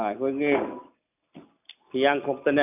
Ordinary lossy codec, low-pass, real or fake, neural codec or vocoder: none; 3.6 kHz; real; none